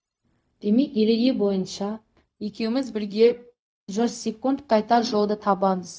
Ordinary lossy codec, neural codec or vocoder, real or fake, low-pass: none; codec, 16 kHz, 0.4 kbps, LongCat-Audio-Codec; fake; none